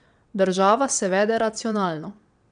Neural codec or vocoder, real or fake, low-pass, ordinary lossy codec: vocoder, 22.05 kHz, 80 mel bands, WaveNeXt; fake; 9.9 kHz; none